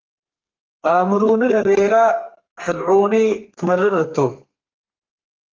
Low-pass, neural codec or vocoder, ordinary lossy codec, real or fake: 7.2 kHz; codec, 32 kHz, 1.9 kbps, SNAC; Opus, 24 kbps; fake